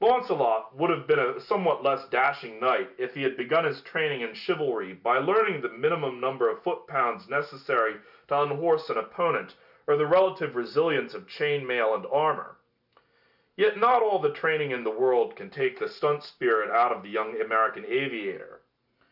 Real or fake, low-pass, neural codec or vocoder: real; 5.4 kHz; none